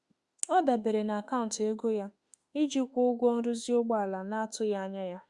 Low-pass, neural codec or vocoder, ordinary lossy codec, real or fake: 10.8 kHz; autoencoder, 48 kHz, 32 numbers a frame, DAC-VAE, trained on Japanese speech; Opus, 64 kbps; fake